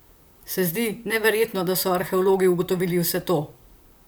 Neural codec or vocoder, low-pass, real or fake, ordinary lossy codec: vocoder, 44.1 kHz, 128 mel bands, Pupu-Vocoder; none; fake; none